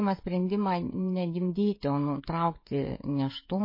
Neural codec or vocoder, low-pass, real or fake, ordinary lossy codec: codec, 16 kHz, 16 kbps, FreqCodec, smaller model; 5.4 kHz; fake; MP3, 24 kbps